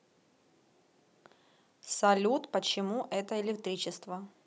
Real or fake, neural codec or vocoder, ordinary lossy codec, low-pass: real; none; none; none